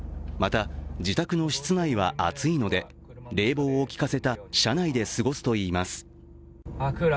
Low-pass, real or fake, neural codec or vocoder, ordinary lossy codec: none; real; none; none